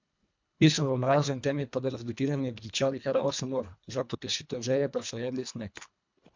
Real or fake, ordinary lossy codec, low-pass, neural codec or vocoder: fake; MP3, 64 kbps; 7.2 kHz; codec, 24 kHz, 1.5 kbps, HILCodec